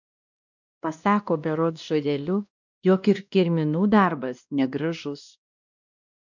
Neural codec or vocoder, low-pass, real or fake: codec, 16 kHz, 1 kbps, X-Codec, WavLM features, trained on Multilingual LibriSpeech; 7.2 kHz; fake